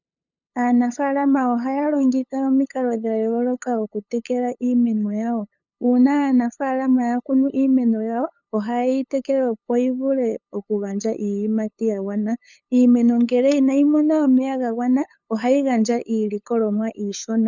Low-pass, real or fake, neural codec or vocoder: 7.2 kHz; fake; codec, 16 kHz, 8 kbps, FunCodec, trained on LibriTTS, 25 frames a second